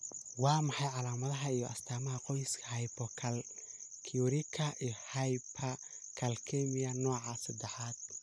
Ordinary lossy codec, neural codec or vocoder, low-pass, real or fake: none; none; none; real